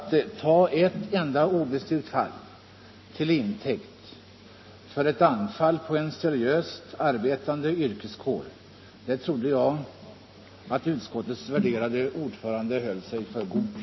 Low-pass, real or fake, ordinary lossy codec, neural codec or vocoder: 7.2 kHz; real; MP3, 24 kbps; none